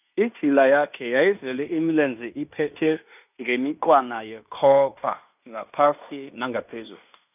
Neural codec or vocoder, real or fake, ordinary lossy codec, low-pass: codec, 16 kHz in and 24 kHz out, 0.9 kbps, LongCat-Audio-Codec, fine tuned four codebook decoder; fake; none; 3.6 kHz